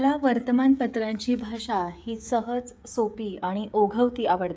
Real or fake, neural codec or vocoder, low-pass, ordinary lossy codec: fake; codec, 16 kHz, 16 kbps, FreqCodec, smaller model; none; none